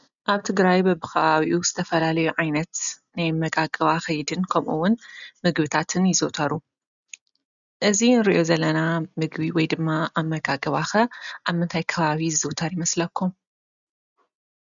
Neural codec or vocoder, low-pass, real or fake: none; 7.2 kHz; real